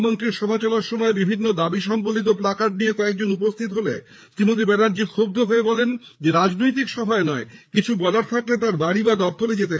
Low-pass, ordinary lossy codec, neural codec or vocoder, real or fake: none; none; codec, 16 kHz, 4 kbps, FreqCodec, larger model; fake